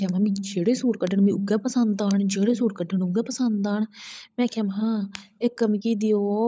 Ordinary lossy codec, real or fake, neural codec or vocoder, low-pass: none; fake; codec, 16 kHz, 16 kbps, FunCodec, trained on LibriTTS, 50 frames a second; none